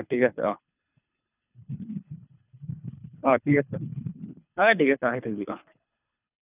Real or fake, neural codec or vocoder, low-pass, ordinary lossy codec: fake; codec, 24 kHz, 3 kbps, HILCodec; 3.6 kHz; none